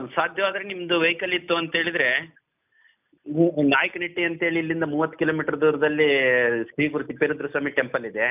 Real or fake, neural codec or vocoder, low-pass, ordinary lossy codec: real; none; 3.6 kHz; none